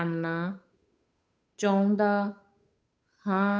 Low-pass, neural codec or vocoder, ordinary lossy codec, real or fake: none; codec, 16 kHz, 8 kbps, FunCodec, trained on Chinese and English, 25 frames a second; none; fake